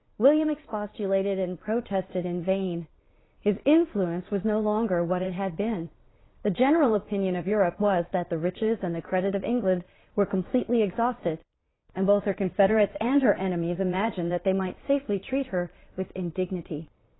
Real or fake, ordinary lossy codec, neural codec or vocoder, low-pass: fake; AAC, 16 kbps; vocoder, 22.05 kHz, 80 mel bands, Vocos; 7.2 kHz